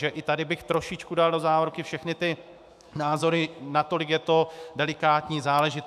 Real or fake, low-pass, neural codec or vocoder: fake; 14.4 kHz; autoencoder, 48 kHz, 128 numbers a frame, DAC-VAE, trained on Japanese speech